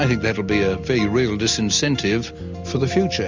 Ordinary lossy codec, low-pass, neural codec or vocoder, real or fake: MP3, 64 kbps; 7.2 kHz; none; real